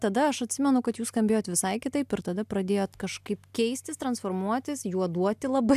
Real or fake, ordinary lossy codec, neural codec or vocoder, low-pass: real; AAC, 96 kbps; none; 14.4 kHz